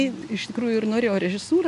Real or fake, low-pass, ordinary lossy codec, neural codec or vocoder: real; 10.8 kHz; AAC, 96 kbps; none